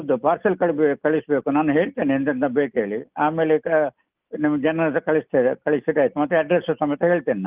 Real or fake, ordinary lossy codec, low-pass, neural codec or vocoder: real; Opus, 32 kbps; 3.6 kHz; none